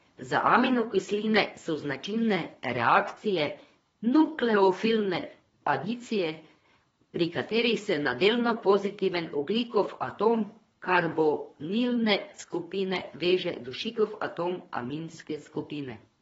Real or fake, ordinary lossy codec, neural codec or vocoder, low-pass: fake; AAC, 24 kbps; codec, 24 kHz, 3 kbps, HILCodec; 10.8 kHz